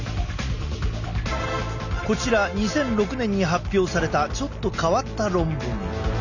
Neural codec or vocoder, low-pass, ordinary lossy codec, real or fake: none; 7.2 kHz; none; real